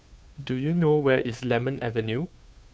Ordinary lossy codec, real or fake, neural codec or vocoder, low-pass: none; fake; codec, 16 kHz, 2 kbps, FunCodec, trained on Chinese and English, 25 frames a second; none